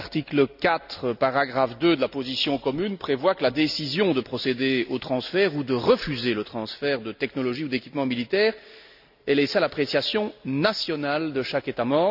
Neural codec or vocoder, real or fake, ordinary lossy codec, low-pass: none; real; none; 5.4 kHz